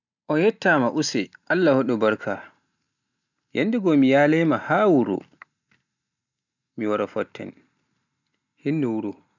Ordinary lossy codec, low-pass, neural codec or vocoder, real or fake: none; 7.2 kHz; none; real